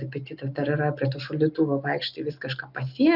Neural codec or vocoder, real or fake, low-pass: none; real; 5.4 kHz